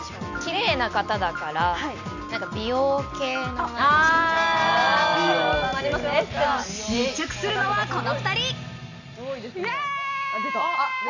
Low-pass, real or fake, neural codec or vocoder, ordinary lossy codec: 7.2 kHz; real; none; none